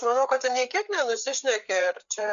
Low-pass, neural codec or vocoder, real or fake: 7.2 kHz; codec, 16 kHz, 4 kbps, FreqCodec, larger model; fake